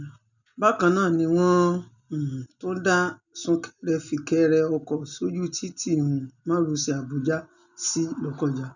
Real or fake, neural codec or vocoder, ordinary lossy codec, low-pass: real; none; none; 7.2 kHz